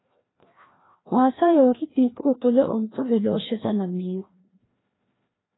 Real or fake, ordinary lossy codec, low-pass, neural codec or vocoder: fake; AAC, 16 kbps; 7.2 kHz; codec, 16 kHz, 1 kbps, FreqCodec, larger model